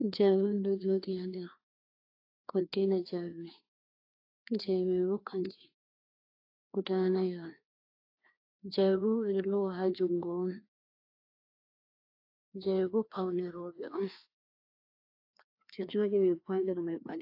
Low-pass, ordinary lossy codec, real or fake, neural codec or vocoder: 5.4 kHz; AAC, 32 kbps; fake; codec, 16 kHz, 2 kbps, FreqCodec, larger model